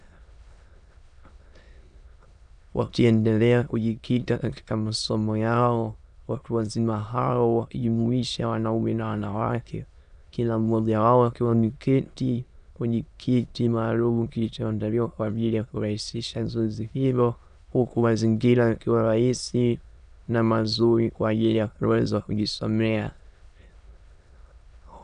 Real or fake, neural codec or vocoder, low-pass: fake; autoencoder, 22.05 kHz, a latent of 192 numbers a frame, VITS, trained on many speakers; 9.9 kHz